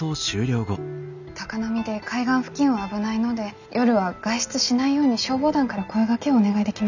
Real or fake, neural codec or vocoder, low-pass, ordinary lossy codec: real; none; 7.2 kHz; none